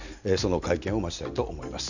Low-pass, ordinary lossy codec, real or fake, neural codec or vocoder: 7.2 kHz; none; real; none